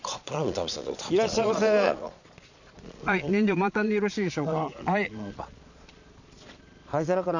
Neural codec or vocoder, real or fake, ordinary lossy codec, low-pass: vocoder, 22.05 kHz, 80 mel bands, Vocos; fake; none; 7.2 kHz